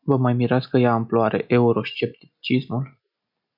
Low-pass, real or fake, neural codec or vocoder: 5.4 kHz; real; none